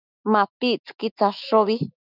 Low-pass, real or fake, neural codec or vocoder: 5.4 kHz; fake; autoencoder, 48 kHz, 128 numbers a frame, DAC-VAE, trained on Japanese speech